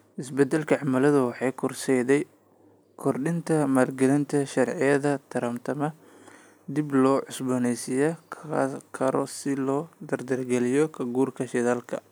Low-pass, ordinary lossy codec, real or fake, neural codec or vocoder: none; none; real; none